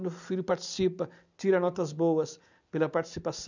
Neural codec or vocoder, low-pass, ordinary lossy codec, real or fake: none; 7.2 kHz; none; real